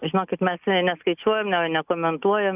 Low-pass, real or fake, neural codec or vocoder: 3.6 kHz; real; none